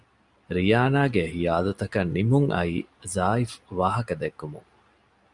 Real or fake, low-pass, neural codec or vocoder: real; 10.8 kHz; none